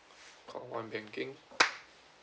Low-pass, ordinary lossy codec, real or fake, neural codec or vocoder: none; none; real; none